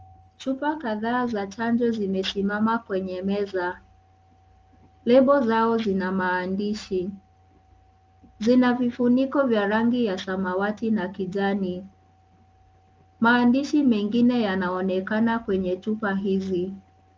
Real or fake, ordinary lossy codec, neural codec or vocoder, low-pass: real; Opus, 24 kbps; none; 7.2 kHz